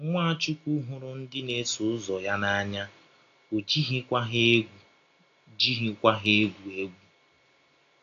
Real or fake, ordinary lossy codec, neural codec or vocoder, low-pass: real; AAC, 48 kbps; none; 7.2 kHz